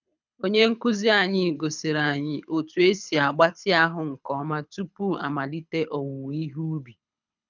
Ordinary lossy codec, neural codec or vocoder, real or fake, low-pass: none; codec, 24 kHz, 6 kbps, HILCodec; fake; 7.2 kHz